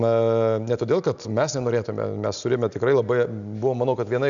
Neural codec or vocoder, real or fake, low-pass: none; real; 7.2 kHz